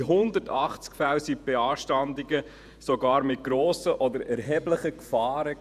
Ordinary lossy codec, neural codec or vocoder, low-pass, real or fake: none; vocoder, 48 kHz, 128 mel bands, Vocos; 14.4 kHz; fake